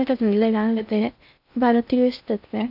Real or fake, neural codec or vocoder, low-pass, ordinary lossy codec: fake; codec, 16 kHz in and 24 kHz out, 0.6 kbps, FocalCodec, streaming, 2048 codes; 5.4 kHz; none